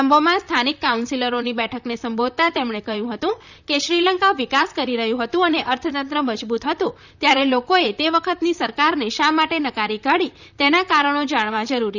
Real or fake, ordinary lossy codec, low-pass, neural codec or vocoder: fake; none; 7.2 kHz; vocoder, 44.1 kHz, 128 mel bands, Pupu-Vocoder